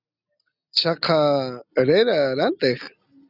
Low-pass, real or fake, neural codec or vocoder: 5.4 kHz; real; none